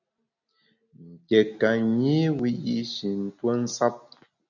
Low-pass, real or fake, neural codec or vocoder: 7.2 kHz; real; none